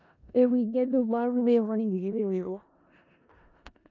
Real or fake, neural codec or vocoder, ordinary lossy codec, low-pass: fake; codec, 16 kHz in and 24 kHz out, 0.4 kbps, LongCat-Audio-Codec, four codebook decoder; none; 7.2 kHz